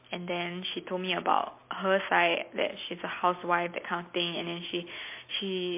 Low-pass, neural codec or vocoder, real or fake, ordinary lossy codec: 3.6 kHz; none; real; MP3, 24 kbps